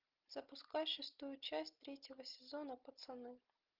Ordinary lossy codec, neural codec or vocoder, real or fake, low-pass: Opus, 24 kbps; none; real; 5.4 kHz